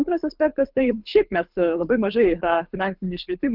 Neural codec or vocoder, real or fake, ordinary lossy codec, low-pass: codec, 24 kHz, 6 kbps, HILCodec; fake; Opus, 24 kbps; 5.4 kHz